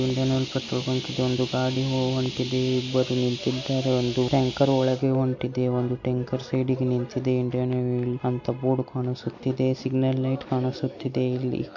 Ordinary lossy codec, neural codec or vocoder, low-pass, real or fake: MP3, 48 kbps; none; 7.2 kHz; real